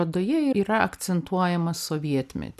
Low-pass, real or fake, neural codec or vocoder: 14.4 kHz; real; none